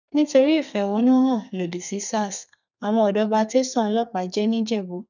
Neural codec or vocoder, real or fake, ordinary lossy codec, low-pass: codec, 32 kHz, 1.9 kbps, SNAC; fake; none; 7.2 kHz